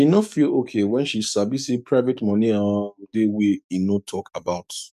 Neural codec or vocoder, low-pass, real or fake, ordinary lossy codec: codec, 44.1 kHz, 7.8 kbps, DAC; 14.4 kHz; fake; none